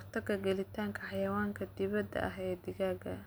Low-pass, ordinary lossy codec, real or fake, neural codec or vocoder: none; none; real; none